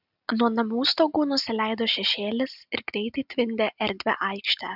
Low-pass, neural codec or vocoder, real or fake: 5.4 kHz; none; real